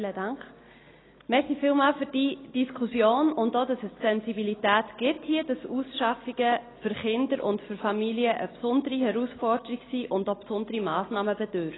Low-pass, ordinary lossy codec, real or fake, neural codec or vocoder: 7.2 kHz; AAC, 16 kbps; real; none